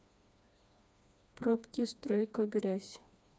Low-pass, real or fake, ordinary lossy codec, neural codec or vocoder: none; fake; none; codec, 16 kHz, 2 kbps, FreqCodec, smaller model